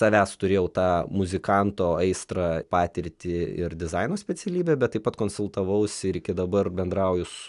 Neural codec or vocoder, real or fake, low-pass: none; real; 10.8 kHz